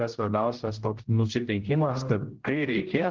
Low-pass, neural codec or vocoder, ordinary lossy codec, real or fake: 7.2 kHz; codec, 16 kHz, 0.5 kbps, X-Codec, HuBERT features, trained on general audio; Opus, 16 kbps; fake